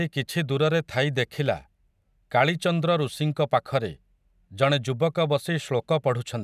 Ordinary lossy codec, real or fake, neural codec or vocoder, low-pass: none; real; none; 14.4 kHz